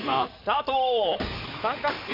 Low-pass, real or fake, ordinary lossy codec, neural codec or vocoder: 5.4 kHz; fake; MP3, 48 kbps; codec, 16 kHz in and 24 kHz out, 2.2 kbps, FireRedTTS-2 codec